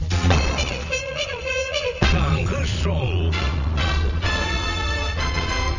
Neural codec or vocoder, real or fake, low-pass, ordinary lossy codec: vocoder, 22.05 kHz, 80 mel bands, Vocos; fake; 7.2 kHz; none